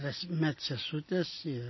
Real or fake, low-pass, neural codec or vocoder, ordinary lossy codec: real; 7.2 kHz; none; MP3, 24 kbps